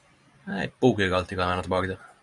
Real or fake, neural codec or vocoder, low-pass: real; none; 10.8 kHz